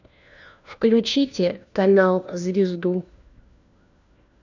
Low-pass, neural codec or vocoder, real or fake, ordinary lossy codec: 7.2 kHz; codec, 16 kHz, 1 kbps, FunCodec, trained on LibriTTS, 50 frames a second; fake; none